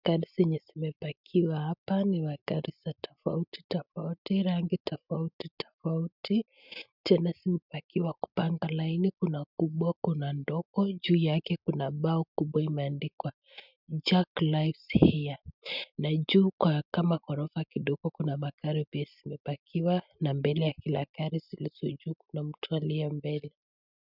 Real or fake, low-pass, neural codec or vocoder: real; 5.4 kHz; none